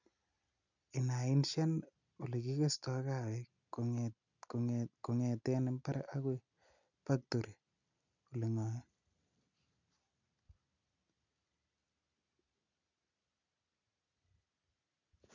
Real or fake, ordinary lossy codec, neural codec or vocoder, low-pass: real; none; none; 7.2 kHz